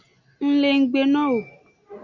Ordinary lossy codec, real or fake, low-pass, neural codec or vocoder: MP3, 64 kbps; real; 7.2 kHz; none